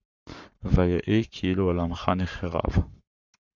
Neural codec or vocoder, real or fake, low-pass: codec, 44.1 kHz, 7.8 kbps, Pupu-Codec; fake; 7.2 kHz